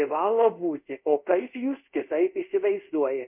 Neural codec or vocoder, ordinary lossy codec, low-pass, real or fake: codec, 24 kHz, 0.5 kbps, DualCodec; MP3, 32 kbps; 3.6 kHz; fake